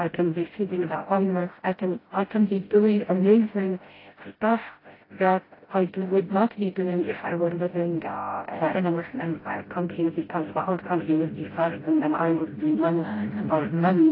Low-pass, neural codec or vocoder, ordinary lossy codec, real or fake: 5.4 kHz; codec, 16 kHz, 0.5 kbps, FreqCodec, smaller model; AAC, 24 kbps; fake